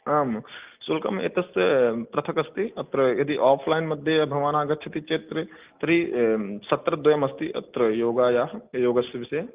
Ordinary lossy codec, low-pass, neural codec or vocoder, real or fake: Opus, 32 kbps; 3.6 kHz; none; real